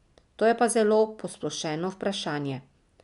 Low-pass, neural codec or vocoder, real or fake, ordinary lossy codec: 10.8 kHz; none; real; none